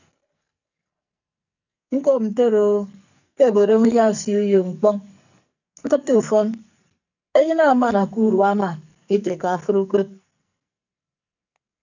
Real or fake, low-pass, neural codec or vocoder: fake; 7.2 kHz; codec, 44.1 kHz, 2.6 kbps, SNAC